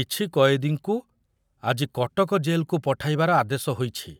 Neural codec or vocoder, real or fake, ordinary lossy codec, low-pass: none; real; none; none